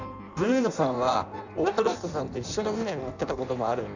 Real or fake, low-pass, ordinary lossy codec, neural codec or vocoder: fake; 7.2 kHz; none; codec, 16 kHz in and 24 kHz out, 0.6 kbps, FireRedTTS-2 codec